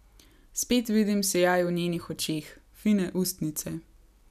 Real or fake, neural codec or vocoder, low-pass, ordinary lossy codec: real; none; 14.4 kHz; none